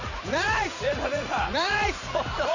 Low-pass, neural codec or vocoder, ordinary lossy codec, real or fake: 7.2 kHz; vocoder, 44.1 kHz, 80 mel bands, Vocos; none; fake